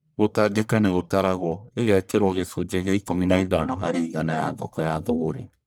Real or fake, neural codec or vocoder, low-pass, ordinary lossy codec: fake; codec, 44.1 kHz, 1.7 kbps, Pupu-Codec; none; none